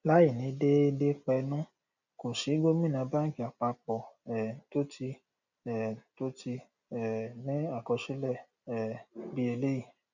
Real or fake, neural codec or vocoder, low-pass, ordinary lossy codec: real; none; 7.2 kHz; none